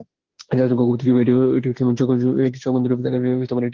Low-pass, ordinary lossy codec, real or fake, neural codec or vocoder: 7.2 kHz; Opus, 16 kbps; fake; autoencoder, 48 kHz, 32 numbers a frame, DAC-VAE, trained on Japanese speech